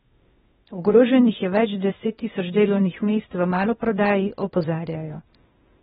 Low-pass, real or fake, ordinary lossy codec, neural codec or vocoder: 7.2 kHz; fake; AAC, 16 kbps; codec, 16 kHz, 0.8 kbps, ZipCodec